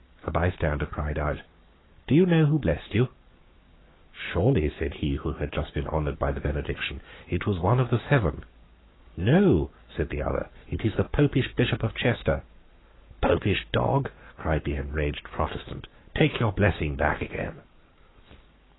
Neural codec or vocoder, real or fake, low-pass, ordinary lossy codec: codec, 44.1 kHz, 7.8 kbps, Pupu-Codec; fake; 7.2 kHz; AAC, 16 kbps